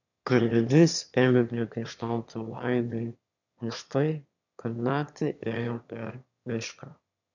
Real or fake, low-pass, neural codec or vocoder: fake; 7.2 kHz; autoencoder, 22.05 kHz, a latent of 192 numbers a frame, VITS, trained on one speaker